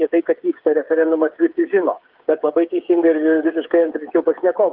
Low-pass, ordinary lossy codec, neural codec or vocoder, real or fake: 5.4 kHz; Opus, 32 kbps; codec, 16 kHz, 8 kbps, FreqCodec, smaller model; fake